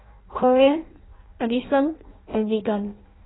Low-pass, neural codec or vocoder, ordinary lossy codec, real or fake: 7.2 kHz; codec, 16 kHz in and 24 kHz out, 0.6 kbps, FireRedTTS-2 codec; AAC, 16 kbps; fake